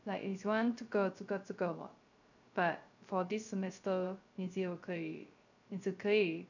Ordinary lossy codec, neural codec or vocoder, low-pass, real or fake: none; codec, 16 kHz, 0.3 kbps, FocalCodec; 7.2 kHz; fake